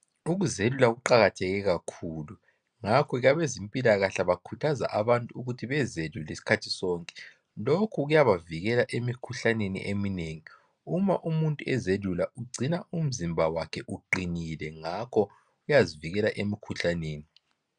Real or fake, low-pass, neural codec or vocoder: real; 9.9 kHz; none